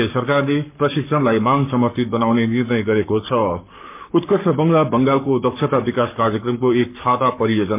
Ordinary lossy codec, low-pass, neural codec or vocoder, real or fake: MP3, 32 kbps; 3.6 kHz; codec, 44.1 kHz, 7.8 kbps, Pupu-Codec; fake